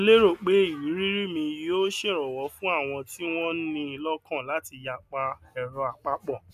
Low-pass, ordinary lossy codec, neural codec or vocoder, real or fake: 14.4 kHz; none; none; real